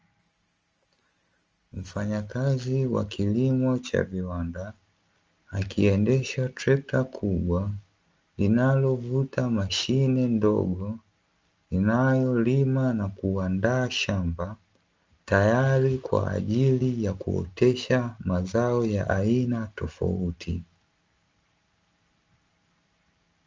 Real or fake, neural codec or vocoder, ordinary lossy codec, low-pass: real; none; Opus, 24 kbps; 7.2 kHz